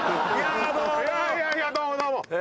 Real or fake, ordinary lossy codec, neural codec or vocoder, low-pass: real; none; none; none